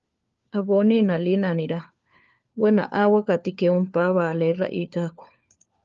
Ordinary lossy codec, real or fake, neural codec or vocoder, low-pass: Opus, 32 kbps; fake; codec, 16 kHz, 4 kbps, FunCodec, trained on LibriTTS, 50 frames a second; 7.2 kHz